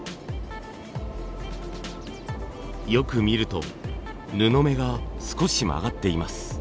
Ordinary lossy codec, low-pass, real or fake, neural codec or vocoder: none; none; real; none